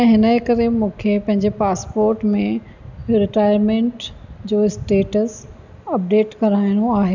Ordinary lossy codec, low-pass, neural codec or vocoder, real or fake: none; 7.2 kHz; none; real